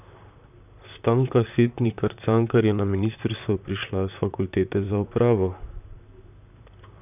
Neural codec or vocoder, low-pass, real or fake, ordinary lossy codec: vocoder, 44.1 kHz, 128 mel bands, Pupu-Vocoder; 3.6 kHz; fake; none